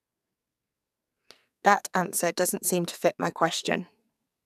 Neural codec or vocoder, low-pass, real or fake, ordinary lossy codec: codec, 44.1 kHz, 2.6 kbps, SNAC; 14.4 kHz; fake; none